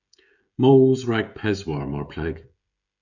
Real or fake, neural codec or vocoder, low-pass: fake; codec, 16 kHz, 16 kbps, FreqCodec, smaller model; 7.2 kHz